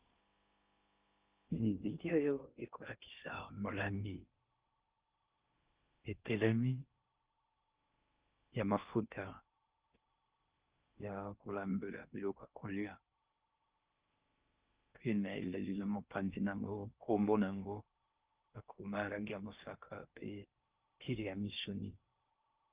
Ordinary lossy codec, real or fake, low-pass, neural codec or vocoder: Opus, 24 kbps; fake; 3.6 kHz; codec, 16 kHz in and 24 kHz out, 0.6 kbps, FocalCodec, streaming, 4096 codes